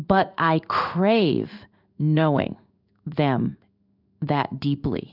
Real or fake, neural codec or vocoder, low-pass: fake; codec, 16 kHz in and 24 kHz out, 1 kbps, XY-Tokenizer; 5.4 kHz